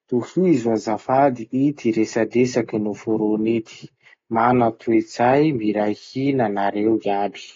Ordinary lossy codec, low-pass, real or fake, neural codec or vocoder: AAC, 32 kbps; 7.2 kHz; real; none